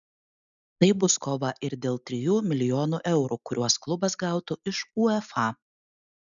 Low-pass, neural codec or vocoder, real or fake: 7.2 kHz; none; real